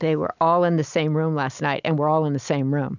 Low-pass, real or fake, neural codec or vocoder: 7.2 kHz; real; none